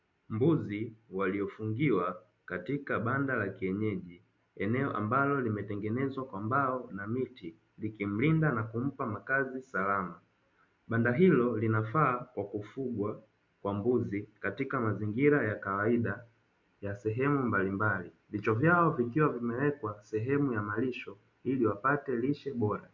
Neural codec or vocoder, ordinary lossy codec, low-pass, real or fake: none; MP3, 64 kbps; 7.2 kHz; real